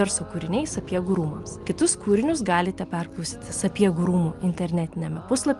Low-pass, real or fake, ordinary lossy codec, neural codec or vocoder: 10.8 kHz; real; Opus, 32 kbps; none